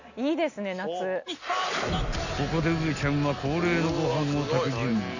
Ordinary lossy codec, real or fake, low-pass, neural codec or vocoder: none; real; 7.2 kHz; none